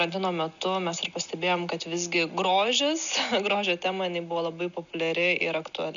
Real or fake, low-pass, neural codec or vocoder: real; 7.2 kHz; none